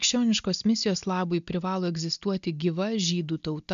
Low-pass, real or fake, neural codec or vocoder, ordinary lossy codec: 7.2 kHz; real; none; MP3, 64 kbps